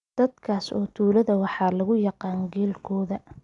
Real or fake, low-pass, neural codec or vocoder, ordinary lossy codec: real; 10.8 kHz; none; none